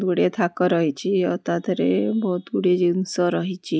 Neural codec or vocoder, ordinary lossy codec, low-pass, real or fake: none; none; none; real